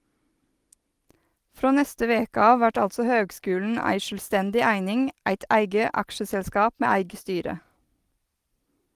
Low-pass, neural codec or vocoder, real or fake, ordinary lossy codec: 14.4 kHz; none; real; Opus, 24 kbps